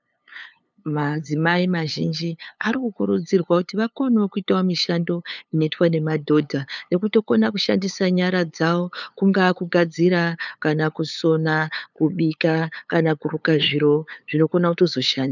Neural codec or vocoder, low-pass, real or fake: codec, 16 kHz, 8 kbps, FunCodec, trained on LibriTTS, 25 frames a second; 7.2 kHz; fake